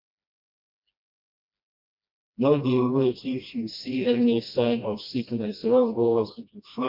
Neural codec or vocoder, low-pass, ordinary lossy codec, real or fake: codec, 16 kHz, 1 kbps, FreqCodec, smaller model; 5.4 kHz; MP3, 48 kbps; fake